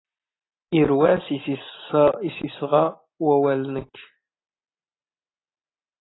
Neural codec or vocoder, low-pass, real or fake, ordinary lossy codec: none; 7.2 kHz; real; AAC, 16 kbps